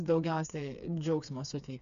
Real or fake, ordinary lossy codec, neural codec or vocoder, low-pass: fake; AAC, 64 kbps; codec, 16 kHz, 4 kbps, FreqCodec, smaller model; 7.2 kHz